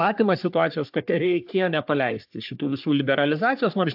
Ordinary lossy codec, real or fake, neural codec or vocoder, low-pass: AAC, 48 kbps; fake; codec, 44.1 kHz, 3.4 kbps, Pupu-Codec; 5.4 kHz